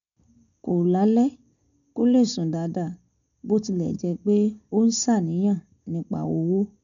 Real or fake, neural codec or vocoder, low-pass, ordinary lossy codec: real; none; 7.2 kHz; none